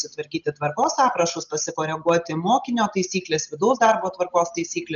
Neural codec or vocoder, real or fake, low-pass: none; real; 7.2 kHz